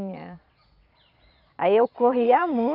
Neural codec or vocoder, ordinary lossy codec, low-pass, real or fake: vocoder, 22.05 kHz, 80 mel bands, Vocos; none; 5.4 kHz; fake